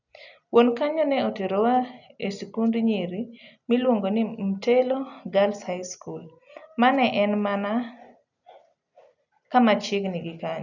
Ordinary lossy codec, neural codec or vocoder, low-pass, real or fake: none; none; 7.2 kHz; real